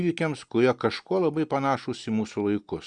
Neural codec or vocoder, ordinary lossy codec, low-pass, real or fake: none; Opus, 64 kbps; 9.9 kHz; real